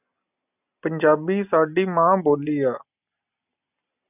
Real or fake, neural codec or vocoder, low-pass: real; none; 3.6 kHz